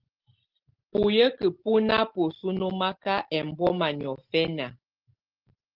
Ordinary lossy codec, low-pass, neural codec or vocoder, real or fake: Opus, 16 kbps; 5.4 kHz; none; real